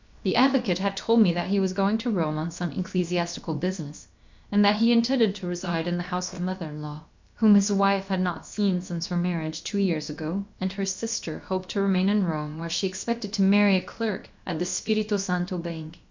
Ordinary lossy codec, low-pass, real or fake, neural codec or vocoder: MP3, 64 kbps; 7.2 kHz; fake; codec, 16 kHz, about 1 kbps, DyCAST, with the encoder's durations